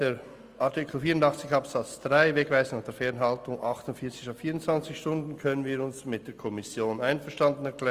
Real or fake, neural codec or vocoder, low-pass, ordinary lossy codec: real; none; 14.4 kHz; Opus, 32 kbps